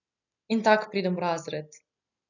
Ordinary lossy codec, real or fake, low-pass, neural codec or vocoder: none; real; 7.2 kHz; none